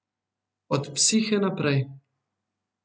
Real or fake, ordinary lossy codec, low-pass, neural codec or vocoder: real; none; none; none